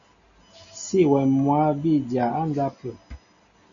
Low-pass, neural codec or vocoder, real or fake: 7.2 kHz; none; real